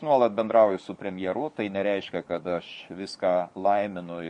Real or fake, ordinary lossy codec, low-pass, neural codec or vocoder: fake; MP3, 48 kbps; 10.8 kHz; codec, 44.1 kHz, 7.8 kbps, DAC